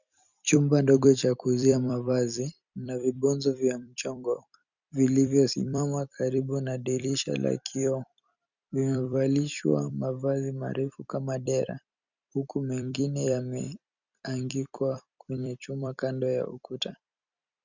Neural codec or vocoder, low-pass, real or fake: vocoder, 44.1 kHz, 128 mel bands every 256 samples, BigVGAN v2; 7.2 kHz; fake